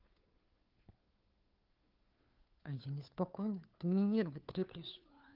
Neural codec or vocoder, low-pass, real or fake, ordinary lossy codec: codec, 16 kHz, 2 kbps, FunCodec, trained on Chinese and English, 25 frames a second; 5.4 kHz; fake; Opus, 32 kbps